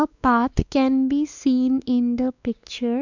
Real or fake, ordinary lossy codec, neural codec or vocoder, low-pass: fake; none; codec, 16 kHz, 2 kbps, X-Codec, WavLM features, trained on Multilingual LibriSpeech; 7.2 kHz